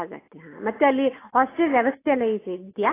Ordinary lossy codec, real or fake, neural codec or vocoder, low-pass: AAC, 16 kbps; real; none; 3.6 kHz